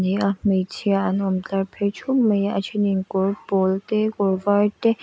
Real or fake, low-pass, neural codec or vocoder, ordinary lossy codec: real; none; none; none